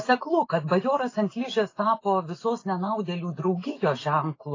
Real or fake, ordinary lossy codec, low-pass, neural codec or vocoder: real; AAC, 32 kbps; 7.2 kHz; none